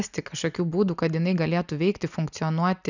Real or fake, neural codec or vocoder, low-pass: real; none; 7.2 kHz